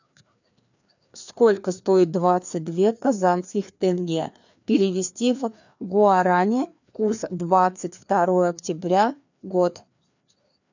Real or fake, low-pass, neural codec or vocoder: fake; 7.2 kHz; codec, 16 kHz, 2 kbps, FreqCodec, larger model